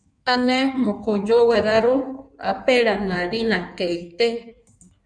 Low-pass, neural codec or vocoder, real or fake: 9.9 kHz; codec, 16 kHz in and 24 kHz out, 1.1 kbps, FireRedTTS-2 codec; fake